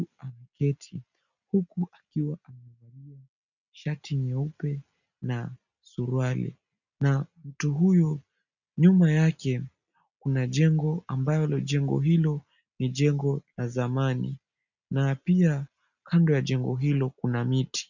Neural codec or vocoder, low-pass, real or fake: none; 7.2 kHz; real